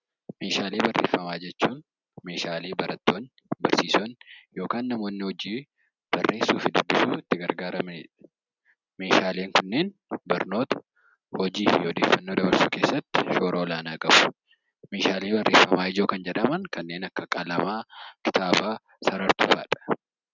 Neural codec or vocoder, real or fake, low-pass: none; real; 7.2 kHz